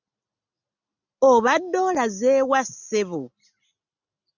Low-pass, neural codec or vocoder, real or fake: 7.2 kHz; none; real